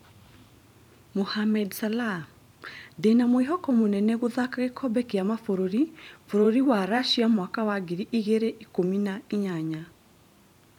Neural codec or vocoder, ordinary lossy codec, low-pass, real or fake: vocoder, 44.1 kHz, 128 mel bands every 512 samples, BigVGAN v2; none; 19.8 kHz; fake